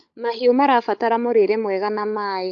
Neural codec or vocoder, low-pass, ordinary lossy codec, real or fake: codec, 16 kHz, 16 kbps, FunCodec, trained on LibriTTS, 50 frames a second; 7.2 kHz; AAC, 64 kbps; fake